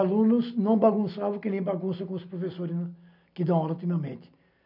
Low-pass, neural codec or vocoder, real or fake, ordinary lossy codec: 5.4 kHz; none; real; none